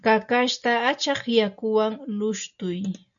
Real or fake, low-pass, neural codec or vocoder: real; 7.2 kHz; none